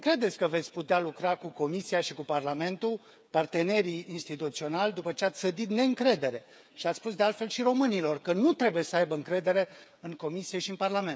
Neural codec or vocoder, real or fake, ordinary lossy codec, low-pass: codec, 16 kHz, 8 kbps, FreqCodec, smaller model; fake; none; none